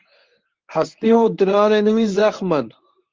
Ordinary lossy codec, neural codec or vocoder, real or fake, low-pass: Opus, 32 kbps; codec, 24 kHz, 0.9 kbps, WavTokenizer, medium speech release version 1; fake; 7.2 kHz